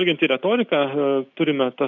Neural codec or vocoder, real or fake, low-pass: none; real; 7.2 kHz